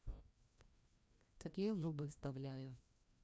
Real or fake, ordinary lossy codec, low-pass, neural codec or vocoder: fake; none; none; codec, 16 kHz, 1 kbps, FreqCodec, larger model